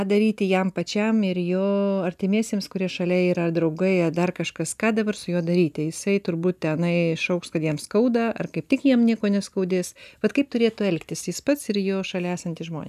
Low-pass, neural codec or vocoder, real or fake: 14.4 kHz; none; real